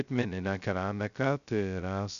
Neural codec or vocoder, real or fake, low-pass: codec, 16 kHz, 0.2 kbps, FocalCodec; fake; 7.2 kHz